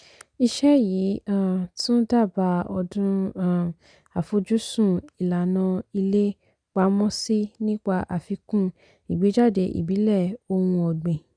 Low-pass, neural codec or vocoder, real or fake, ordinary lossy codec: 9.9 kHz; none; real; none